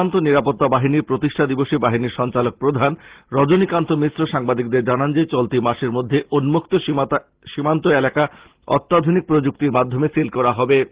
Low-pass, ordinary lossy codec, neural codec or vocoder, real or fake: 3.6 kHz; Opus, 16 kbps; none; real